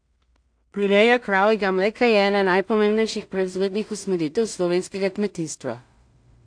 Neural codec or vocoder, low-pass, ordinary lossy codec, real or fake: codec, 16 kHz in and 24 kHz out, 0.4 kbps, LongCat-Audio-Codec, two codebook decoder; 9.9 kHz; AAC, 64 kbps; fake